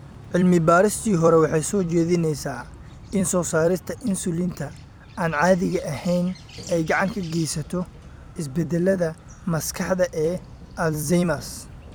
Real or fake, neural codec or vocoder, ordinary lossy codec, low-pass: fake; vocoder, 44.1 kHz, 128 mel bands every 256 samples, BigVGAN v2; none; none